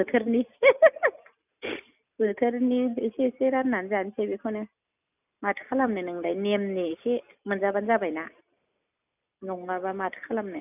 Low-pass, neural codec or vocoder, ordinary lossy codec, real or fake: 3.6 kHz; none; none; real